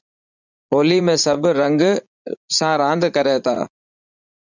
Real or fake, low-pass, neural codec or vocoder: fake; 7.2 kHz; vocoder, 44.1 kHz, 80 mel bands, Vocos